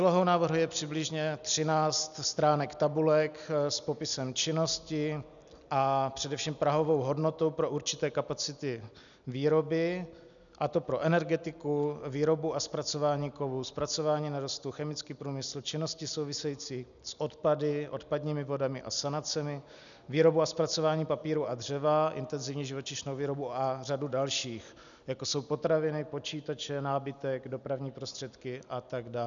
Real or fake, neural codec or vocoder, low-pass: real; none; 7.2 kHz